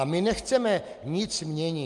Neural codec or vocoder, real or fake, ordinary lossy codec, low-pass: none; real; Opus, 32 kbps; 10.8 kHz